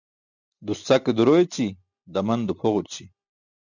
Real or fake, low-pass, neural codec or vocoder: real; 7.2 kHz; none